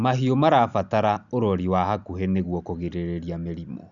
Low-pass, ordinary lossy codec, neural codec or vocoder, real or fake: 7.2 kHz; none; none; real